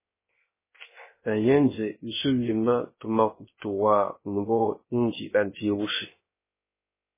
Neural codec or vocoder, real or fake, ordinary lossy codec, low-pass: codec, 16 kHz, 0.3 kbps, FocalCodec; fake; MP3, 16 kbps; 3.6 kHz